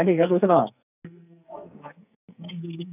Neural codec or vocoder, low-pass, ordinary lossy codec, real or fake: codec, 44.1 kHz, 2.6 kbps, SNAC; 3.6 kHz; none; fake